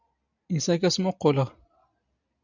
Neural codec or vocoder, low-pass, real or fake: none; 7.2 kHz; real